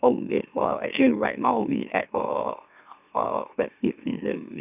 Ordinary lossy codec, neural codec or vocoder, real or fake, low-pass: none; autoencoder, 44.1 kHz, a latent of 192 numbers a frame, MeloTTS; fake; 3.6 kHz